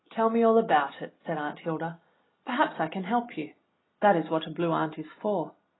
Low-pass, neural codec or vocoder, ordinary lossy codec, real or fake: 7.2 kHz; none; AAC, 16 kbps; real